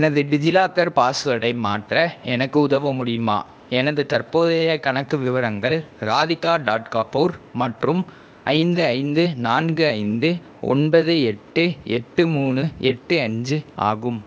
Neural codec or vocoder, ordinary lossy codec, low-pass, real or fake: codec, 16 kHz, 0.8 kbps, ZipCodec; none; none; fake